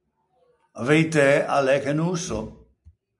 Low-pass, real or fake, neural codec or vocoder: 10.8 kHz; real; none